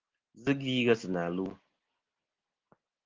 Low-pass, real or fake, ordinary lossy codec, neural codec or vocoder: 7.2 kHz; real; Opus, 16 kbps; none